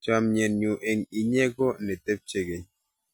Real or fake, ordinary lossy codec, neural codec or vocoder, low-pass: real; none; none; none